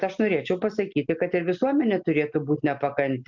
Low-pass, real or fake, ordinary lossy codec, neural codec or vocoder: 7.2 kHz; real; MP3, 64 kbps; none